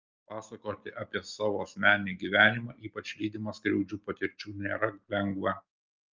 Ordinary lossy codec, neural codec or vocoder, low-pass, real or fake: Opus, 24 kbps; none; 7.2 kHz; real